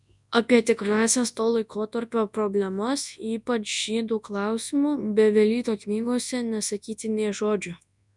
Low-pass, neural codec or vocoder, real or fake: 10.8 kHz; codec, 24 kHz, 0.9 kbps, WavTokenizer, large speech release; fake